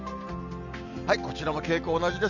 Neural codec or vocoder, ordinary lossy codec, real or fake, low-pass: none; none; real; 7.2 kHz